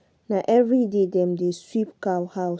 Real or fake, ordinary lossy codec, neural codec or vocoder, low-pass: real; none; none; none